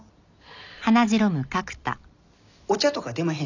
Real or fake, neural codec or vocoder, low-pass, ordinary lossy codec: real; none; 7.2 kHz; none